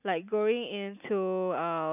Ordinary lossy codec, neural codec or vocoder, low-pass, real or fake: none; none; 3.6 kHz; real